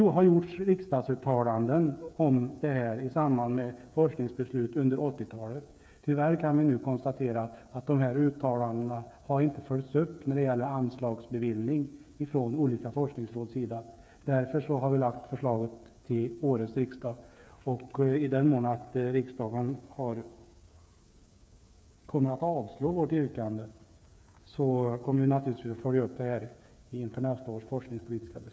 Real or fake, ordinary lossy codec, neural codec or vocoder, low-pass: fake; none; codec, 16 kHz, 8 kbps, FreqCodec, smaller model; none